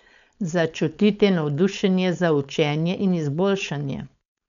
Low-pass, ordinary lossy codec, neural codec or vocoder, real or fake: 7.2 kHz; none; codec, 16 kHz, 4.8 kbps, FACodec; fake